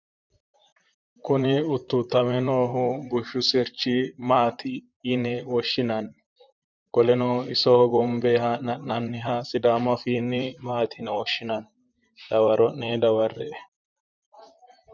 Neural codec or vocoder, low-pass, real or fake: vocoder, 44.1 kHz, 128 mel bands, Pupu-Vocoder; 7.2 kHz; fake